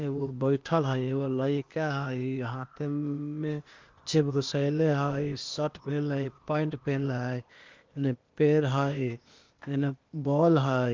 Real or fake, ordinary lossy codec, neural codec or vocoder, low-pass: fake; Opus, 32 kbps; codec, 16 kHz, 0.8 kbps, ZipCodec; 7.2 kHz